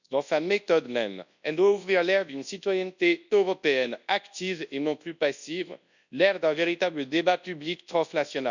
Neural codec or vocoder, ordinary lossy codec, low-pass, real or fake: codec, 24 kHz, 0.9 kbps, WavTokenizer, large speech release; none; 7.2 kHz; fake